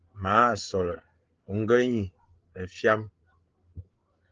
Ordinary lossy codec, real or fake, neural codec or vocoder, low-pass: Opus, 16 kbps; fake; codec, 16 kHz, 8 kbps, FreqCodec, larger model; 7.2 kHz